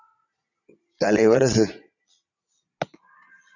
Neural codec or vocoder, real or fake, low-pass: vocoder, 22.05 kHz, 80 mel bands, Vocos; fake; 7.2 kHz